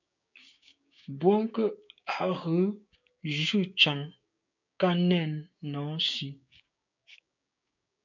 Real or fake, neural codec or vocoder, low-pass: fake; codec, 16 kHz, 6 kbps, DAC; 7.2 kHz